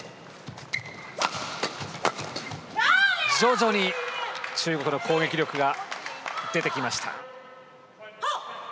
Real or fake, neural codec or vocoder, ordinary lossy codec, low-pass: real; none; none; none